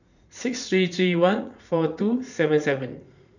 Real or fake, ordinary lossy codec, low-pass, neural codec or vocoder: fake; none; 7.2 kHz; vocoder, 44.1 kHz, 80 mel bands, Vocos